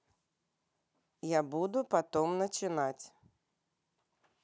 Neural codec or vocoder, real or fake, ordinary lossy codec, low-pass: none; real; none; none